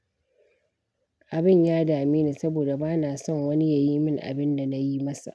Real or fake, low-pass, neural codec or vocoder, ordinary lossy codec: real; none; none; none